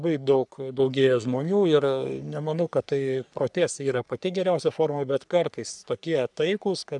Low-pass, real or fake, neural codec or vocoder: 10.8 kHz; fake; codec, 44.1 kHz, 3.4 kbps, Pupu-Codec